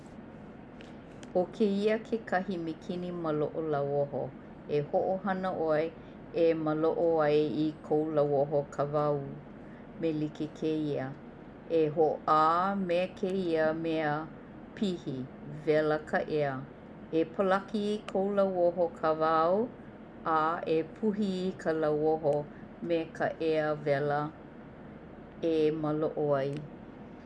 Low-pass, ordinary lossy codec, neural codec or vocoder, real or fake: none; none; none; real